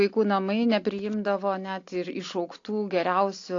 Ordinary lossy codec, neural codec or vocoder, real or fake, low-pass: AAC, 48 kbps; none; real; 7.2 kHz